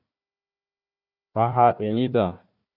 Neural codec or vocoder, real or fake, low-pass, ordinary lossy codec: codec, 16 kHz, 1 kbps, FunCodec, trained on Chinese and English, 50 frames a second; fake; 5.4 kHz; Opus, 64 kbps